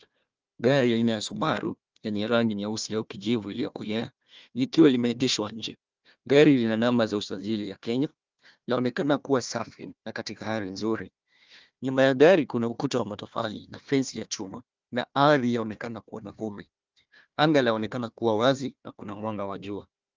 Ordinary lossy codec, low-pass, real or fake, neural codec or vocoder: Opus, 24 kbps; 7.2 kHz; fake; codec, 16 kHz, 1 kbps, FunCodec, trained on Chinese and English, 50 frames a second